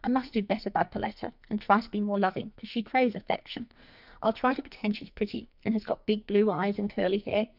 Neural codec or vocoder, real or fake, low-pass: codec, 44.1 kHz, 2.6 kbps, SNAC; fake; 5.4 kHz